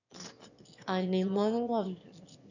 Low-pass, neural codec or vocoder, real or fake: 7.2 kHz; autoencoder, 22.05 kHz, a latent of 192 numbers a frame, VITS, trained on one speaker; fake